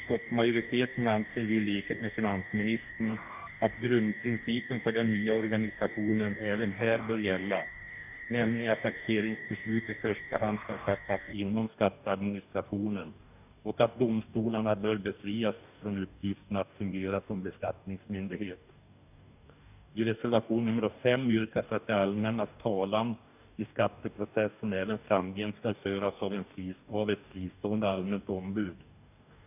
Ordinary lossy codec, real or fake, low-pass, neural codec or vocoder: none; fake; 3.6 kHz; codec, 44.1 kHz, 2.6 kbps, DAC